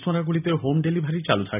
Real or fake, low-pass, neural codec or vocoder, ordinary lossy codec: real; 3.6 kHz; none; none